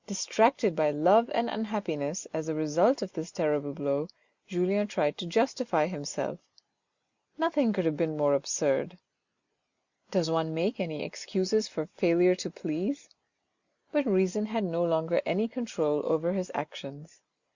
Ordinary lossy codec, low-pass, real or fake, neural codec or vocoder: Opus, 64 kbps; 7.2 kHz; real; none